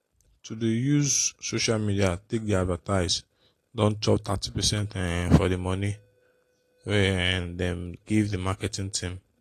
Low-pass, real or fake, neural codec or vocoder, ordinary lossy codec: 14.4 kHz; real; none; AAC, 48 kbps